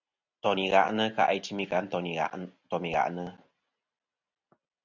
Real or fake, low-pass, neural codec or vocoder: real; 7.2 kHz; none